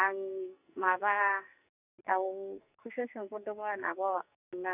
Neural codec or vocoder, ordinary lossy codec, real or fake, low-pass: codec, 44.1 kHz, 2.6 kbps, SNAC; none; fake; 3.6 kHz